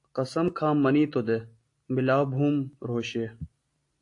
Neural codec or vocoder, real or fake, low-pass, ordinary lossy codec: none; real; 10.8 kHz; AAC, 48 kbps